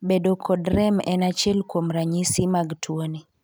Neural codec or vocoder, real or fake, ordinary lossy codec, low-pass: none; real; none; none